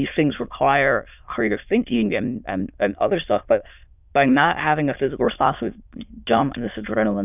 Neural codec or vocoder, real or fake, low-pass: autoencoder, 22.05 kHz, a latent of 192 numbers a frame, VITS, trained on many speakers; fake; 3.6 kHz